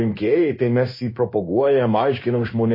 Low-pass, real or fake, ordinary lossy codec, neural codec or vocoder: 5.4 kHz; fake; MP3, 24 kbps; codec, 16 kHz in and 24 kHz out, 1 kbps, XY-Tokenizer